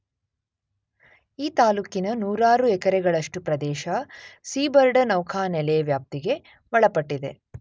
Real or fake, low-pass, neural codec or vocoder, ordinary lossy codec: real; none; none; none